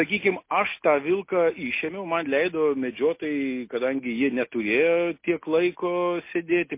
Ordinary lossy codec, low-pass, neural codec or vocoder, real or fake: MP3, 24 kbps; 3.6 kHz; none; real